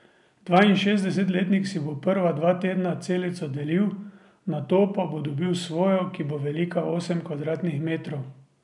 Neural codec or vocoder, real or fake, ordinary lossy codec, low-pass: none; real; none; 10.8 kHz